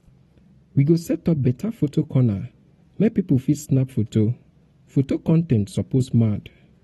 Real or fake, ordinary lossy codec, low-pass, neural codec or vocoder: real; AAC, 32 kbps; 19.8 kHz; none